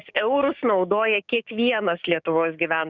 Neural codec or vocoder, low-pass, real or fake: codec, 16 kHz, 6 kbps, DAC; 7.2 kHz; fake